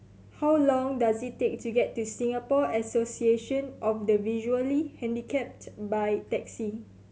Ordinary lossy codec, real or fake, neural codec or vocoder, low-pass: none; real; none; none